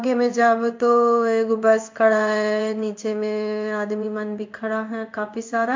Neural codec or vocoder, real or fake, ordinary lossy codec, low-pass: codec, 16 kHz in and 24 kHz out, 1 kbps, XY-Tokenizer; fake; MP3, 48 kbps; 7.2 kHz